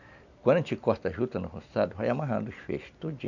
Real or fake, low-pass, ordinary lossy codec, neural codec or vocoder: real; 7.2 kHz; none; none